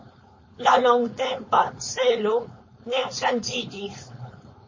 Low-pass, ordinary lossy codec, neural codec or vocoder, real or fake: 7.2 kHz; MP3, 32 kbps; codec, 16 kHz, 4.8 kbps, FACodec; fake